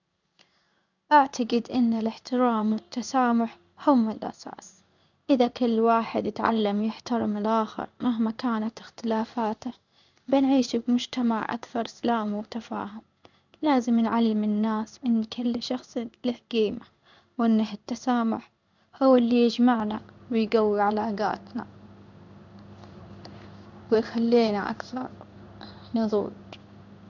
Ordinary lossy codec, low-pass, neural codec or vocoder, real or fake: none; 7.2 kHz; codec, 16 kHz in and 24 kHz out, 1 kbps, XY-Tokenizer; fake